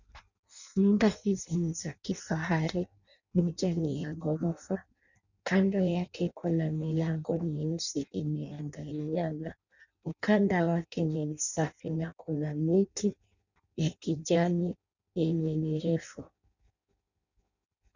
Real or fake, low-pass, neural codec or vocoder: fake; 7.2 kHz; codec, 16 kHz in and 24 kHz out, 0.6 kbps, FireRedTTS-2 codec